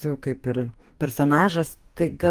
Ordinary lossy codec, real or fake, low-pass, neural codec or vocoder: Opus, 32 kbps; fake; 14.4 kHz; codec, 44.1 kHz, 2.6 kbps, DAC